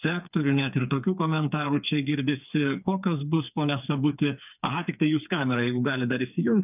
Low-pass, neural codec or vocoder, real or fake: 3.6 kHz; codec, 16 kHz, 4 kbps, FreqCodec, smaller model; fake